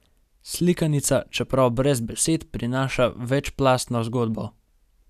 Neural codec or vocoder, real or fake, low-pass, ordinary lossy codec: none; real; 14.4 kHz; none